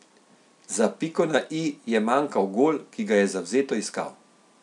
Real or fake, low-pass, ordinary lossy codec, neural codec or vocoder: real; 10.8 kHz; none; none